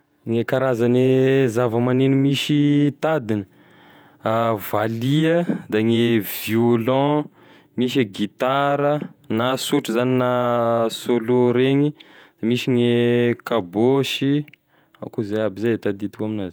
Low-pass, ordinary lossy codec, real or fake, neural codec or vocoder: none; none; fake; vocoder, 48 kHz, 128 mel bands, Vocos